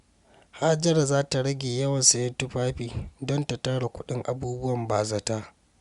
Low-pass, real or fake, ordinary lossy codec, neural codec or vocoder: 10.8 kHz; real; Opus, 64 kbps; none